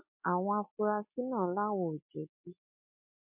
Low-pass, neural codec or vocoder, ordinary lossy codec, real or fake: 3.6 kHz; none; MP3, 32 kbps; real